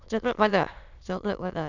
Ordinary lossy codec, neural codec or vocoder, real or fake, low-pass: none; autoencoder, 22.05 kHz, a latent of 192 numbers a frame, VITS, trained on many speakers; fake; 7.2 kHz